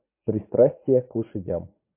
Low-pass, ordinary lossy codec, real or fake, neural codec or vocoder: 3.6 kHz; MP3, 24 kbps; fake; vocoder, 44.1 kHz, 80 mel bands, Vocos